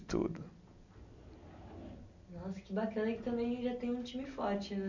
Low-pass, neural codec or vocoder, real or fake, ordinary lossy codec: 7.2 kHz; none; real; none